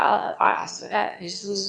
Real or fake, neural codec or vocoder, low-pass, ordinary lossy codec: fake; autoencoder, 22.05 kHz, a latent of 192 numbers a frame, VITS, trained on one speaker; 9.9 kHz; AAC, 64 kbps